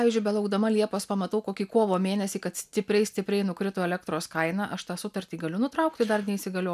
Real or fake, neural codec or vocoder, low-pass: real; none; 14.4 kHz